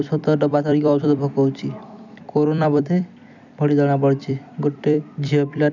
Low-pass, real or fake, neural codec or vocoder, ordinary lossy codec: 7.2 kHz; fake; vocoder, 44.1 kHz, 128 mel bands every 256 samples, BigVGAN v2; none